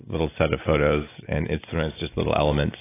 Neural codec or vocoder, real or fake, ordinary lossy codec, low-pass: none; real; AAC, 24 kbps; 3.6 kHz